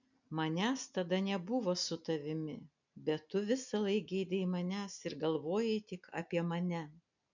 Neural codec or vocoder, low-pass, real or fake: none; 7.2 kHz; real